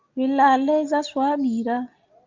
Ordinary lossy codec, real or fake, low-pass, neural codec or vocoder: Opus, 24 kbps; real; 7.2 kHz; none